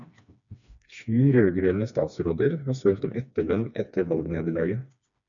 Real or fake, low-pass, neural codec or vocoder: fake; 7.2 kHz; codec, 16 kHz, 2 kbps, FreqCodec, smaller model